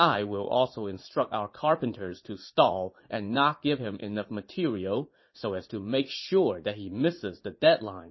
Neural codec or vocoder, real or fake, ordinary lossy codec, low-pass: vocoder, 44.1 kHz, 80 mel bands, Vocos; fake; MP3, 24 kbps; 7.2 kHz